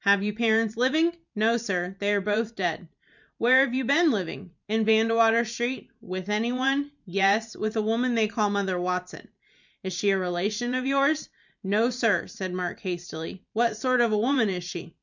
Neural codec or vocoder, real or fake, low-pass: vocoder, 44.1 kHz, 128 mel bands every 512 samples, BigVGAN v2; fake; 7.2 kHz